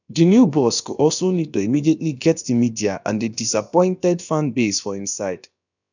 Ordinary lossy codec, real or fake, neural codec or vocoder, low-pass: none; fake; codec, 16 kHz, about 1 kbps, DyCAST, with the encoder's durations; 7.2 kHz